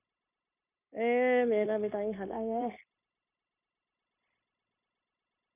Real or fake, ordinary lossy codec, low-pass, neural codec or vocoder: fake; none; 3.6 kHz; codec, 16 kHz, 0.9 kbps, LongCat-Audio-Codec